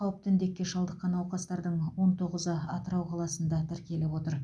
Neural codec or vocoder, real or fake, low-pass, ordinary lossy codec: none; real; none; none